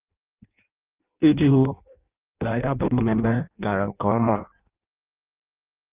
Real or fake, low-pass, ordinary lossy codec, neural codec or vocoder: fake; 3.6 kHz; Opus, 32 kbps; codec, 16 kHz in and 24 kHz out, 0.6 kbps, FireRedTTS-2 codec